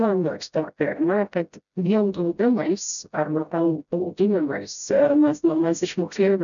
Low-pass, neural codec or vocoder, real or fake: 7.2 kHz; codec, 16 kHz, 0.5 kbps, FreqCodec, smaller model; fake